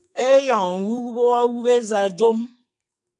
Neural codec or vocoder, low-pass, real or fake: codec, 44.1 kHz, 2.6 kbps, SNAC; 10.8 kHz; fake